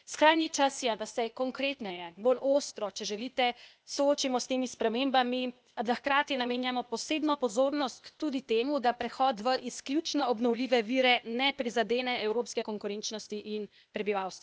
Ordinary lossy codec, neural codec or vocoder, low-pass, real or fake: none; codec, 16 kHz, 0.8 kbps, ZipCodec; none; fake